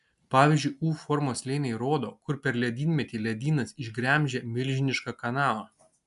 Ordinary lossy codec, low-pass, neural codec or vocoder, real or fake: AAC, 96 kbps; 10.8 kHz; none; real